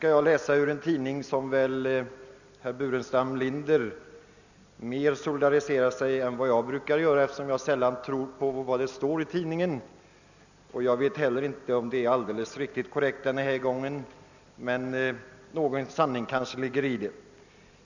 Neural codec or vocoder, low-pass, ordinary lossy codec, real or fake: none; 7.2 kHz; none; real